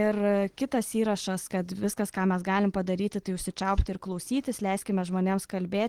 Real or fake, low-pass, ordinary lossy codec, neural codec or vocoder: real; 19.8 kHz; Opus, 16 kbps; none